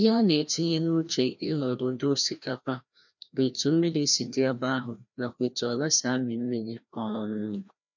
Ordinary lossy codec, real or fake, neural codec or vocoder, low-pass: none; fake; codec, 16 kHz, 1 kbps, FreqCodec, larger model; 7.2 kHz